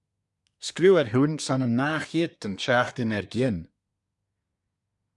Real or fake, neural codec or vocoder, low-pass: fake; codec, 24 kHz, 1 kbps, SNAC; 10.8 kHz